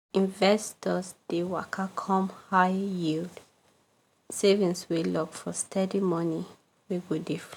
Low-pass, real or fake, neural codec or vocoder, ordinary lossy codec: 19.8 kHz; real; none; none